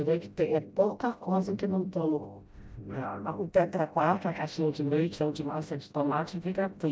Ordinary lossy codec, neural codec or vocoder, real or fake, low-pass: none; codec, 16 kHz, 0.5 kbps, FreqCodec, smaller model; fake; none